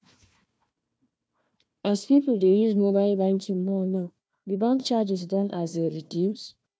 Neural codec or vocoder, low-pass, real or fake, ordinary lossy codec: codec, 16 kHz, 1 kbps, FunCodec, trained on Chinese and English, 50 frames a second; none; fake; none